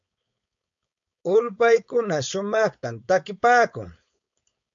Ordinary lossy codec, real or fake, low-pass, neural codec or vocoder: MP3, 64 kbps; fake; 7.2 kHz; codec, 16 kHz, 4.8 kbps, FACodec